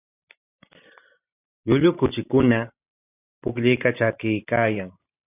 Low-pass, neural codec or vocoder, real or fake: 3.6 kHz; vocoder, 44.1 kHz, 128 mel bands every 512 samples, BigVGAN v2; fake